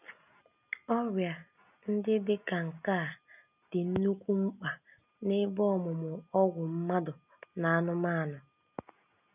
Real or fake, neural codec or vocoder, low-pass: real; none; 3.6 kHz